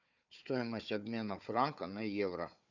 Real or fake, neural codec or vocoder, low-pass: fake; codec, 16 kHz, 4 kbps, FunCodec, trained on Chinese and English, 50 frames a second; 7.2 kHz